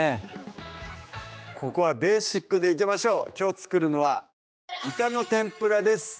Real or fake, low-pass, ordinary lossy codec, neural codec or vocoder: fake; none; none; codec, 16 kHz, 2 kbps, X-Codec, HuBERT features, trained on general audio